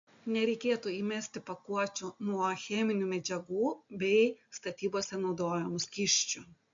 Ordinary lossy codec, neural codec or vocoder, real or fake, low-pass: MP3, 48 kbps; none; real; 7.2 kHz